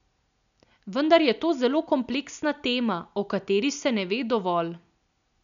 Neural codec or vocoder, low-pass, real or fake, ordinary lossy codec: none; 7.2 kHz; real; none